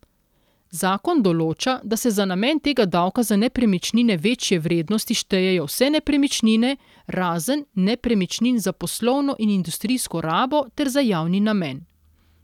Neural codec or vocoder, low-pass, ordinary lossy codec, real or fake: none; 19.8 kHz; none; real